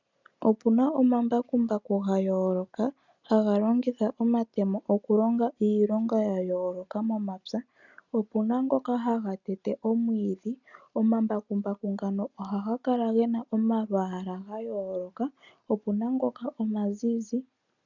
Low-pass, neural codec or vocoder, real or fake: 7.2 kHz; none; real